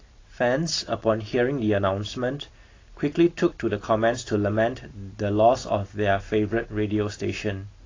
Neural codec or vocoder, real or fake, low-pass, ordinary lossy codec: none; real; 7.2 kHz; AAC, 32 kbps